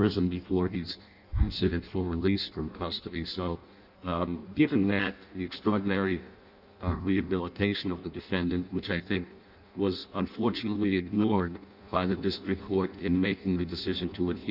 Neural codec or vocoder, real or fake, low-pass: codec, 16 kHz in and 24 kHz out, 0.6 kbps, FireRedTTS-2 codec; fake; 5.4 kHz